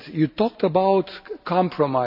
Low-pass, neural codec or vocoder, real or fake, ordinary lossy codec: 5.4 kHz; none; real; none